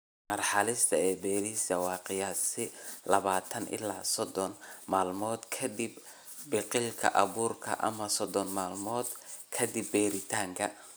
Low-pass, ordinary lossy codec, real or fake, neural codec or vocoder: none; none; real; none